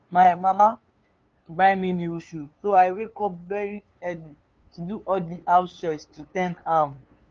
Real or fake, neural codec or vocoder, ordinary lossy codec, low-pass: fake; codec, 16 kHz, 2 kbps, FunCodec, trained on LibriTTS, 25 frames a second; Opus, 24 kbps; 7.2 kHz